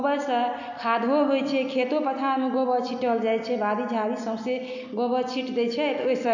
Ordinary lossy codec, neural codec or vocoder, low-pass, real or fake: none; none; 7.2 kHz; real